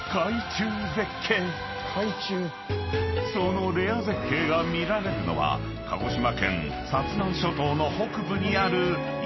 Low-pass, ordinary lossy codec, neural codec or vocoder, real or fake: 7.2 kHz; MP3, 24 kbps; none; real